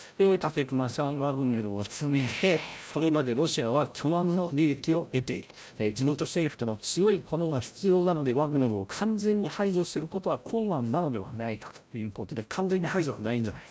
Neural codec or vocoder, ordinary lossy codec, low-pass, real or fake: codec, 16 kHz, 0.5 kbps, FreqCodec, larger model; none; none; fake